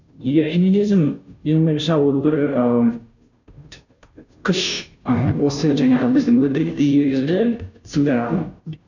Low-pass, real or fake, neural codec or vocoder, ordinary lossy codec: 7.2 kHz; fake; codec, 16 kHz, 0.5 kbps, FunCodec, trained on Chinese and English, 25 frames a second; none